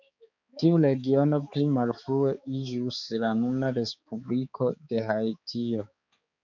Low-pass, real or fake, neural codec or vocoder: 7.2 kHz; fake; codec, 16 kHz, 4 kbps, X-Codec, HuBERT features, trained on balanced general audio